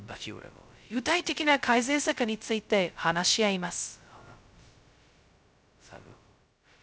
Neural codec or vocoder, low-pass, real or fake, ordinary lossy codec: codec, 16 kHz, 0.2 kbps, FocalCodec; none; fake; none